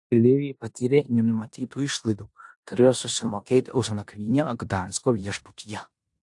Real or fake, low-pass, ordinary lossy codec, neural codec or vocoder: fake; 10.8 kHz; AAC, 64 kbps; codec, 16 kHz in and 24 kHz out, 0.9 kbps, LongCat-Audio-Codec, four codebook decoder